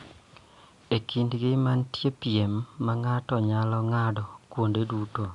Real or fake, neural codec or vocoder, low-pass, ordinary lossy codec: real; none; 10.8 kHz; Opus, 64 kbps